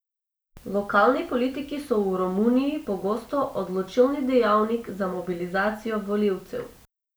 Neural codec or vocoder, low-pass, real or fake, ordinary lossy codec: none; none; real; none